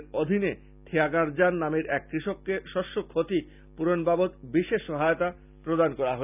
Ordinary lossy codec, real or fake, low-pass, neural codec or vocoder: none; real; 3.6 kHz; none